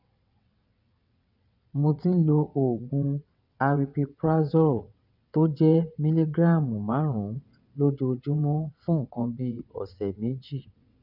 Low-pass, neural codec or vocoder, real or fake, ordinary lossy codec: 5.4 kHz; vocoder, 22.05 kHz, 80 mel bands, WaveNeXt; fake; none